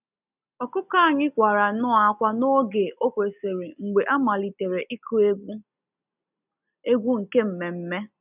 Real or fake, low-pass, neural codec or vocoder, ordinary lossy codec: real; 3.6 kHz; none; none